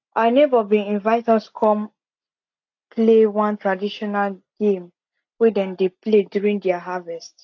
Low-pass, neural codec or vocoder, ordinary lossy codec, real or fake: 7.2 kHz; none; AAC, 48 kbps; real